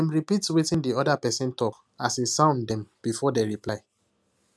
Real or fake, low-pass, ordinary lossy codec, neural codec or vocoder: real; none; none; none